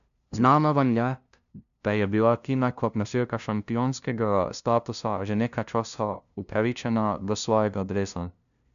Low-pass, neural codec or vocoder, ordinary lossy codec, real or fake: 7.2 kHz; codec, 16 kHz, 0.5 kbps, FunCodec, trained on LibriTTS, 25 frames a second; none; fake